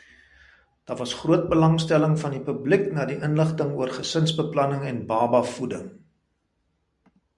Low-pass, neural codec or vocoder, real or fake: 10.8 kHz; none; real